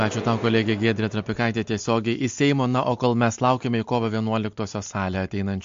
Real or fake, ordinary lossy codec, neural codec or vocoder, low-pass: real; MP3, 48 kbps; none; 7.2 kHz